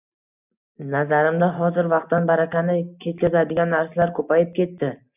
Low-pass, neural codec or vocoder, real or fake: 3.6 kHz; none; real